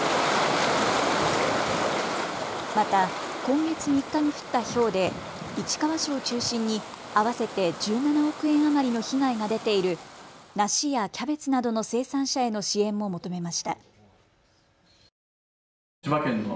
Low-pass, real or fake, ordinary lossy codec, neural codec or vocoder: none; real; none; none